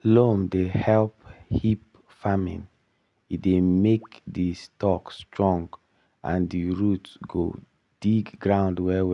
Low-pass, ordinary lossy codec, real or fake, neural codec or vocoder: 10.8 kHz; none; real; none